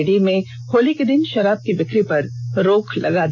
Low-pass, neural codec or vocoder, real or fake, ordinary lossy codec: none; none; real; none